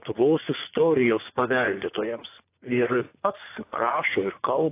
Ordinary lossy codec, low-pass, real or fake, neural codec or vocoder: AAC, 24 kbps; 3.6 kHz; fake; vocoder, 24 kHz, 100 mel bands, Vocos